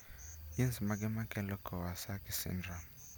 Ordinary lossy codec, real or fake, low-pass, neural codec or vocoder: none; real; none; none